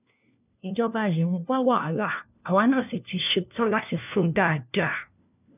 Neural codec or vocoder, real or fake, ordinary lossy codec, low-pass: codec, 16 kHz, 1 kbps, FunCodec, trained on LibriTTS, 50 frames a second; fake; none; 3.6 kHz